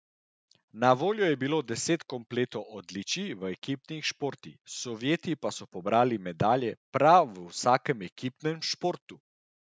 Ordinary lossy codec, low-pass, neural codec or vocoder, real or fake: none; none; none; real